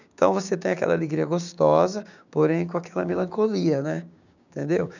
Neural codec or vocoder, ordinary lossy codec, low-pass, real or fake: codec, 16 kHz, 6 kbps, DAC; none; 7.2 kHz; fake